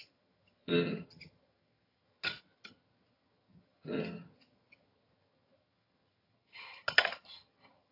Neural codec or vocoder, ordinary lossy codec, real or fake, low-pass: vocoder, 22.05 kHz, 80 mel bands, HiFi-GAN; AAC, 24 kbps; fake; 5.4 kHz